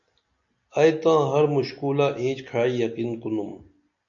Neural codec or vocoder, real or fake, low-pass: none; real; 7.2 kHz